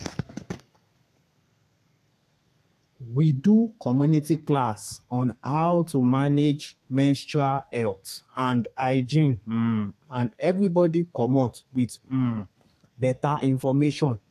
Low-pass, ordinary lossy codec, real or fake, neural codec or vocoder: 14.4 kHz; AAC, 64 kbps; fake; codec, 32 kHz, 1.9 kbps, SNAC